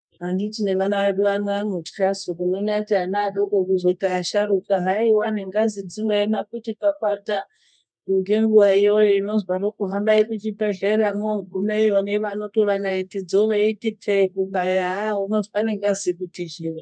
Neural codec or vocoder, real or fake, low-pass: codec, 24 kHz, 0.9 kbps, WavTokenizer, medium music audio release; fake; 9.9 kHz